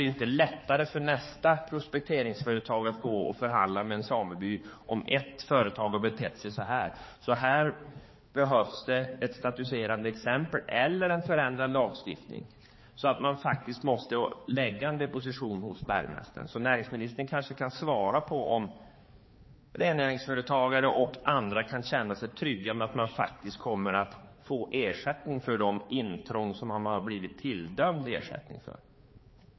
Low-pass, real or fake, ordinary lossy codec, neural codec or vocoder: 7.2 kHz; fake; MP3, 24 kbps; codec, 16 kHz, 4 kbps, X-Codec, HuBERT features, trained on balanced general audio